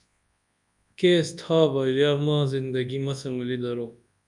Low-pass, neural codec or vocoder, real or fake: 10.8 kHz; codec, 24 kHz, 0.9 kbps, WavTokenizer, large speech release; fake